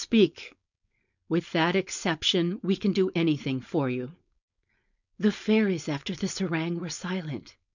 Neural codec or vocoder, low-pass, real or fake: codec, 16 kHz, 4.8 kbps, FACodec; 7.2 kHz; fake